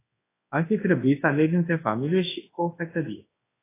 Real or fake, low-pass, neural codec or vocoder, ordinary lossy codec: fake; 3.6 kHz; codec, 24 kHz, 0.9 kbps, WavTokenizer, large speech release; AAC, 16 kbps